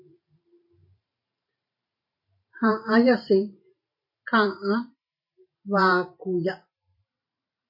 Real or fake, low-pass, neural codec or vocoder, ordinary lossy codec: fake; 5.4 kHz; vocoder, 44.1 kHz, 128 mel bands every 512 samples, BigVGAN v2; MP3, 32 kbps